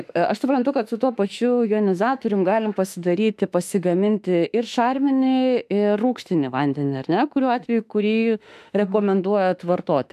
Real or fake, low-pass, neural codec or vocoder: fake; 14.4 kHz; autoencoder, 48 kHz, 32 numbers a frame, DAC-VAE, trained on Japanese speech